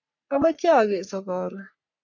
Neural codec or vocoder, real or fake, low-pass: codec, 44.1 kHz, 3.4 kbps, Pupu-Codec; fake; 7.2 kHz